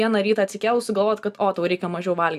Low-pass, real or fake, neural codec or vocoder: 14.4 kHz; real; none